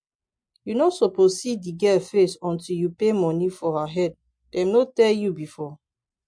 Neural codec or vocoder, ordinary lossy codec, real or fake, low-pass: none; MP3, 48 kbps; real; 9.9 kHz